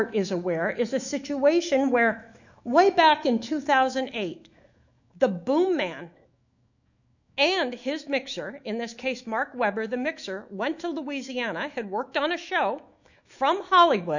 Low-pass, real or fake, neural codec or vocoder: 7.2 kHz; fake; autoencoder, 48 kHz, 128 numbers a frame, DAC-VAE, trained on Japanese speech